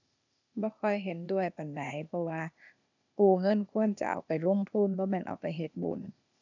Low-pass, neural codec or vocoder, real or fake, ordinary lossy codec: 7.2 kHz; codec, 16 kHz, 0.8 kbps, ZipCodec; fake; none